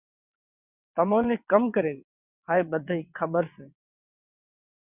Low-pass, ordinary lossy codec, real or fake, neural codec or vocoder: 3.6 kHz; Opus, 64 kbps; fake; vocoder, 22.05 kHz, 80 mel bands, WaveNeXt